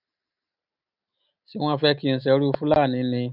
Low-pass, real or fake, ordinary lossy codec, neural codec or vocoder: 5.4 kHz; real; none; none